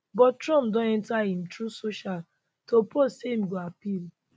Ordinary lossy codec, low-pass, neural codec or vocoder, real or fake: none; none; none; real